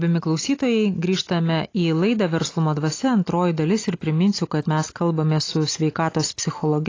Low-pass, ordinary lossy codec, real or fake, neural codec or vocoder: 7.2 kHz; AAC, 32 kbps; real; none